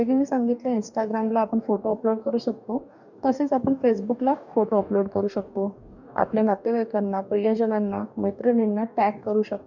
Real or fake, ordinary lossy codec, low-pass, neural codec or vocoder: fake; none; 7.2 kHz; codec, 44.1 kHz, 2.6 kbps, DAC